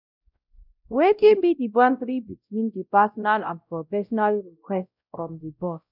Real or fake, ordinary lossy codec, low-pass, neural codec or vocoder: fake; none; 5.4 kHz; codec, 16 kHz, 0.5 kbps, X-Codec, WavLM features, trained on Multilingual LibriSpeech